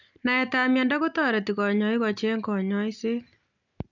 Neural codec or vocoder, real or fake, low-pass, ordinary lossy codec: none; real; 7.2 kHz; none